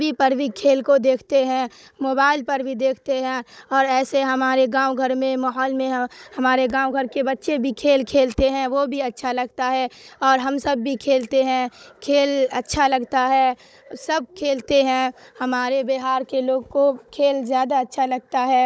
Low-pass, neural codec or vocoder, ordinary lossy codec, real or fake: none; codec, 16 kHz, 16 kbps, FunCodec, trained on Chinese and English, 50 frames a second; none; fake